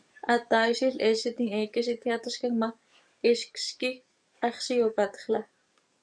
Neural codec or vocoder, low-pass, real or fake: codec, 44.1 kHz, 7.8 kbps, DAC; 9.9 kHz; fake